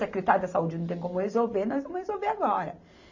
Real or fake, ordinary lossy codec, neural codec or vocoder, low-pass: real; none; none; 7.2 kHz